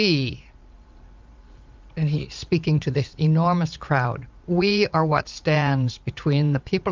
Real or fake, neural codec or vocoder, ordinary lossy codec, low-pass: fake; vocoder, 44.1 kHz, 80 mel bands, Vocos; Opus, 24 kbps; 7.2 kHz